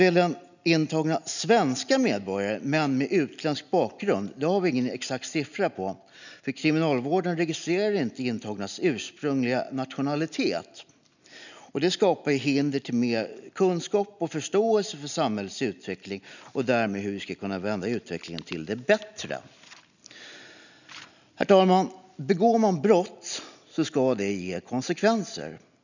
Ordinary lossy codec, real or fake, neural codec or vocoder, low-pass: none; real; none; 7.2 kHz